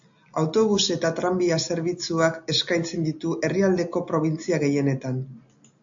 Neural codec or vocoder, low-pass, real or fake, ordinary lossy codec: none; 7.2 kHz; real; AAC, 64 kbps